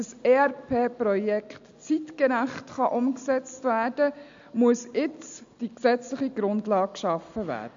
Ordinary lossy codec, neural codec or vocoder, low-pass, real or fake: AAC, 64 kbps; none; 7.2 kHz; real